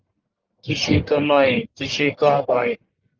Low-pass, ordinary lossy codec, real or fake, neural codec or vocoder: 7.2 kHz; Opus, 24 kbps; fake; codec, 44.1 kHz, 1.7 kbps, Pupu-Codec